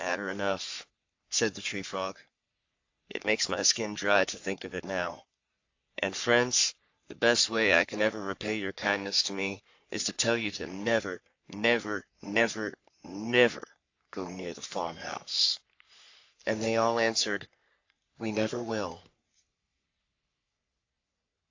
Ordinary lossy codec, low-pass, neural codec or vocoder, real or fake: AAC, 48 kbps; 7.2 kHz; codec, 44.1 kHz, 3.4 kbps, Pupu-Codec; fake